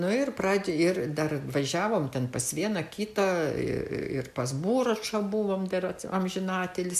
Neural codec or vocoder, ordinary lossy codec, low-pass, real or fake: none; AAC, 96 kbps; 14.4 kHz; real